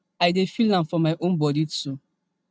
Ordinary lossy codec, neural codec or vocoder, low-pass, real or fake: none; none; none; real